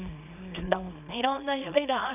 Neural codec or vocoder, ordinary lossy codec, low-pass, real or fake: codec, 24 kHz, 0.9 kbps, WavTokenizer, small release; none; 3.6 kHz; fake